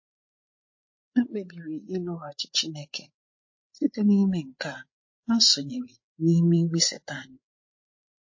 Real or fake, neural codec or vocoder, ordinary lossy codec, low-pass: fake; codec, 16 kHz, 8 kbps, FreqCodec, larger model; MP3, 32 kbps; 7.2 kHz